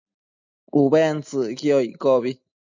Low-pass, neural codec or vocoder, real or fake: 7.2 kHz; none; real